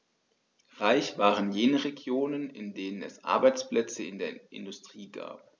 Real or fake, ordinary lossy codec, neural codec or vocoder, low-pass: real; none; none; none